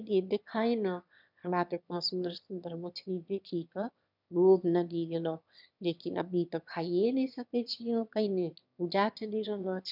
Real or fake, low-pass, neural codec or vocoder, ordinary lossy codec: fake; 5.4 kHz; autoencoder, 22.05 kHz, a latent of 192 numbers a frame, VITS, trained on one speaker; none